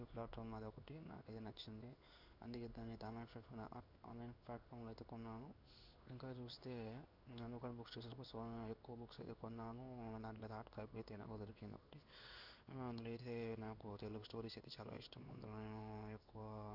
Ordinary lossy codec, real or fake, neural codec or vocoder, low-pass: none; fake; codec, 16 kHz in and 24 kHz out, 1 kbps, XY-Tokenizer; 5.4 kHz